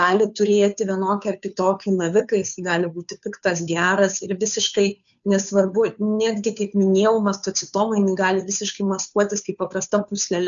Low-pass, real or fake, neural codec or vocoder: 7.2 kHz; fake; codec, 16 kHz, 2 kbps, FunCodec, trained on Chinese and English, 25 frames a second